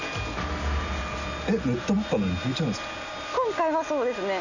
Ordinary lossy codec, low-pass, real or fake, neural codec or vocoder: none; 7.2 kHz; real; none